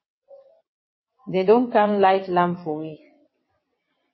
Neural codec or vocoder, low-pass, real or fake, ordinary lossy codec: vocoder, 22.05 kHz, 80 mel bands, WaveNeXt; 7.2 kHz; fake; MP3, 24 kbps